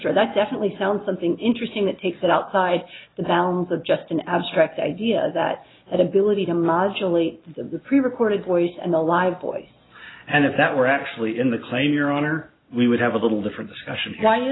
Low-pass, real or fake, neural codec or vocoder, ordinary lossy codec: 7.2 kHz; real; none; AAC, 16 kbps